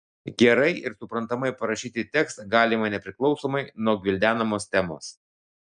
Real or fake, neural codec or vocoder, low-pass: real; none; 9.9 kHz